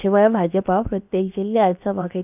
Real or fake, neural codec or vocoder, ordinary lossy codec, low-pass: fake; codec, 16 kHz, about 1 kbps, DyCAST, with the encoder's durations; none; 3.6 kHz